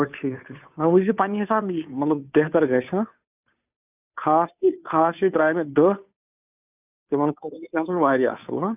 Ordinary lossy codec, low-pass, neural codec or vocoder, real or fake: none; 3.6 kHz; codec, 16 kHz, 2 kbps, FunCodec, trained on Chinese and English, 25 frames a second; fake